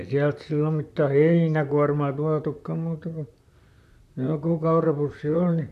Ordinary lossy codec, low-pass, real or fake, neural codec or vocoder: none; 14.4 kHz; fake; vocoder, 44.1 kHz, 128 mel bands, Pupu-Vocoder